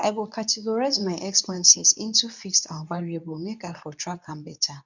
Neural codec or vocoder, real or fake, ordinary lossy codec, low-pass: codec, 24 kHz, 0.9 kbps, WavTokenizer, medium speech release version 2; fake; none; 7.2 kHz